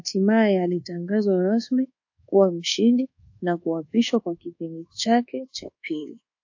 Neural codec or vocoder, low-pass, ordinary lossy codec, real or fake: codec, 24 kHz, 1.2 kbps, DualCodec; 7.2 kHz; AAC, 48 kbps; fake